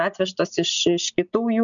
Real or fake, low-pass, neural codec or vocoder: real; 7.2 kHz; none